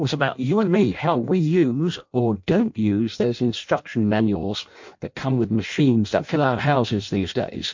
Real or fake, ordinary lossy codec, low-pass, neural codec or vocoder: fake; MP3, 48 kbps; 7.2 kHz; codec, 16 kHz in and 24 kHz out, 0.6 kbps, FireRedTTS-2 codec